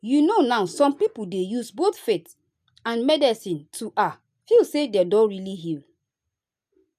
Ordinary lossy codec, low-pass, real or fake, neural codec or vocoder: none; 14.4 kHz; real; none